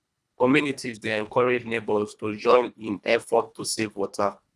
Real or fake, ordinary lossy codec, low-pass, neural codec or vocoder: fake; none; none; codec, 24 kHz, 1.5 kbps, HILCodec